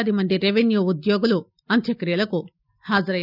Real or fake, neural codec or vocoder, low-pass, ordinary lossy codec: real; none; 5.4 kHz; none